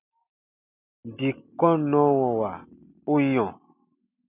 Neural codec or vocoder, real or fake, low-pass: none; real; 3.6 kHz